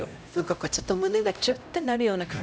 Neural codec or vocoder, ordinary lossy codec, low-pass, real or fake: codec, 16 kHz, 0.5 kbps, X-Codec, HuBERT features, trained on LibriSpeech; none; none; fake